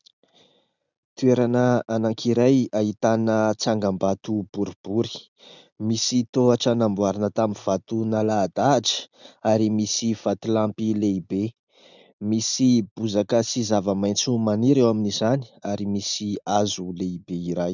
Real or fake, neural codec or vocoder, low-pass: real; none; 7.2 kHz